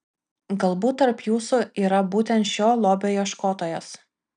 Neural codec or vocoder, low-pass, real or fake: none; 9.9 kHz; real